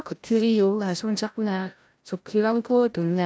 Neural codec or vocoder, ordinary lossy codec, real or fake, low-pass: codec, 16 kHz, 0.5 kbps, FreqCodec, larger model; none; fake; none